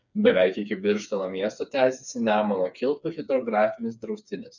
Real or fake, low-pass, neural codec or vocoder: fake; 7.2 kHz; codec, 16 kHz, 4 kbps, FreqCodec, smaller model